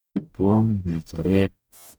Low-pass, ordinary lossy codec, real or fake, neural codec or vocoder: none; none; fake; codec, 44.1 kHz, 0.9 kbps, DAC